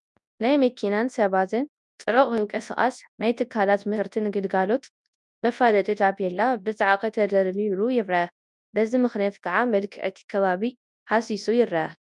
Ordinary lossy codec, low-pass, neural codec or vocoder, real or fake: MP3, 96 kbps; 10.8 kHz; codec, 24 kHz, 0.9 kbps, WavTokenizer, large speech release; fake